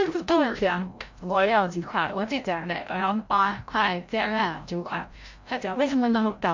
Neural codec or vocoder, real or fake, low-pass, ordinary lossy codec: codec, 16 kHz, 0.5 kbps, FreqCodec, larger model; fake; 7.2 kHz; MP3, 48 kbps